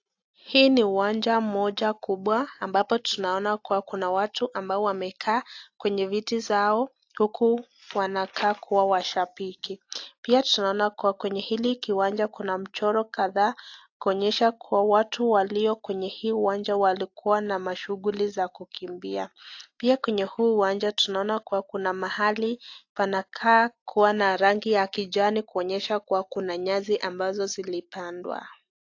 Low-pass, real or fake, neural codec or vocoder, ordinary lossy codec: 7.2 kHz; real; none; AAC, 48 kbps